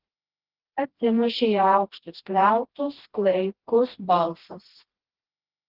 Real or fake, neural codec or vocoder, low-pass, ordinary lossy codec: fake; codec, 16 kHz, 1 kbps, FreqCodec, smaller model; 5.4 kHz; Opus, 16 kbps